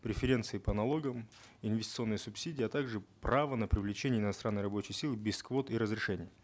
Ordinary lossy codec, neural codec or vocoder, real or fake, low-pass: none; none; real; none